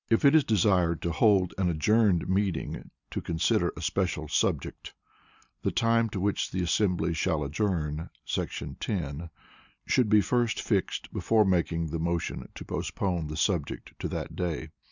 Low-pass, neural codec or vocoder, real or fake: 7.2 kHz; none; real